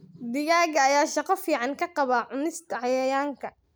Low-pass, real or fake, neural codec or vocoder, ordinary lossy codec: none; real; none; none